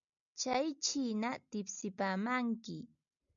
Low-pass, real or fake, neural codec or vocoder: 7.2 kHz; real; none